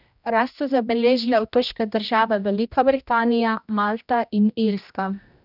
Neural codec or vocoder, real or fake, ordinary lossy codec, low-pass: codec, 16 kHz, 1 kbps, X-Codec, HuBERT features, trained on general audio; fake; none; 5.4 kHz